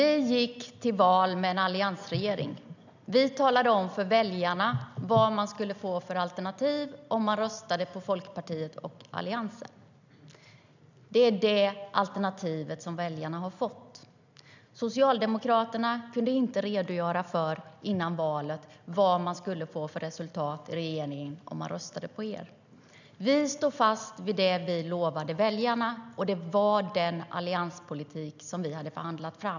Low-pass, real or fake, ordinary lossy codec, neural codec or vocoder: 7.2 kHz; real; none; none